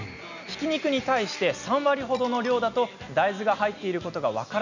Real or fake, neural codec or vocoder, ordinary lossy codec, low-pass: real; none; none; 7.2 kHz